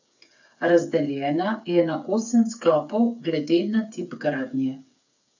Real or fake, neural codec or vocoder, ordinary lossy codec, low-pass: fake; codec, 16 kHz, 8 kbps, FreqCodec, smaller model; none; 7.2 kHz